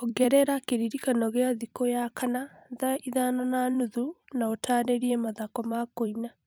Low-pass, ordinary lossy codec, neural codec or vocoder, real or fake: none; none; vocoder, 44.1 kHz, 128 mel bands every 256 samples, BigVGAN v2; fake